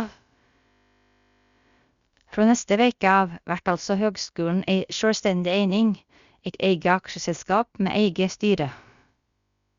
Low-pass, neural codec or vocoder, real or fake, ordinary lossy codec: 7.2 kHz; codec, 16 kHz, about 1 kbps, DyCAST, with the encoder's durations; fake; Opus, 64 kbps